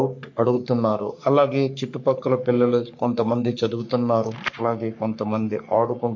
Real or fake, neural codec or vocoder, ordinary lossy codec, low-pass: fake; codec, 44.1 kHz, 3.4 kbps, Pupu-Codec; MP3, 48 kbps; 7.2 kHz